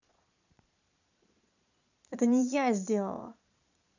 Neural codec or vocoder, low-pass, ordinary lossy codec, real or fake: vocoder, 22.05 kHz, 80 mel bands, Vocos; 7.2 kHz; none; fake